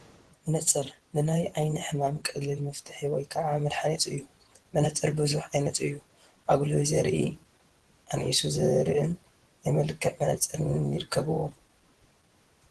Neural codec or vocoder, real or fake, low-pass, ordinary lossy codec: vocoder, 24 kHz, 100 mel bands, Vocos; fake; 10.8 kHz; Opus, 16 kbps